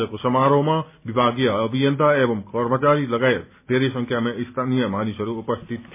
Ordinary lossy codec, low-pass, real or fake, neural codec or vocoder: MP3, 32 kbps; 3.6 kHz; real; none